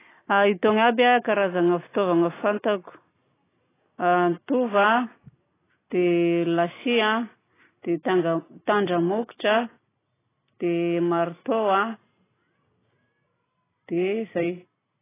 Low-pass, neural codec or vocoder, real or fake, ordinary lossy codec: 3.6 kHz; none; real; AAC, 16 kbps